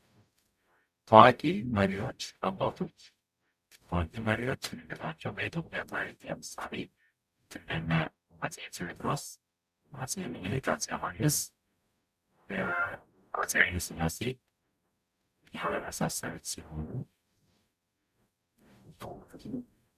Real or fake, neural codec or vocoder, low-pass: fake; codec, 44.1 kHz, 0.9 kbps, DAC; 14.4 kHz